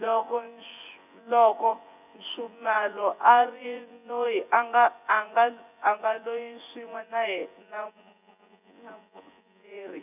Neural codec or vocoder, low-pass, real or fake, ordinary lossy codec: vocoder, 24 kHz, 100 mel bands, Vocos; 3.6 kHz; fake; none